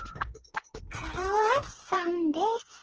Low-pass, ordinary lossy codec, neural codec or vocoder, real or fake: 7.2 kHz; Opus, 16 kbps; codec, 16 kHz, 4 kbps, FreqCodec, smaller model; fake